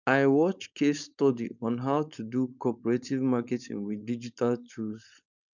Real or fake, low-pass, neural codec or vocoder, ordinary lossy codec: fake; 7.2 kHz; codec, 16 kHz, 4.8 kbps, FACodec; none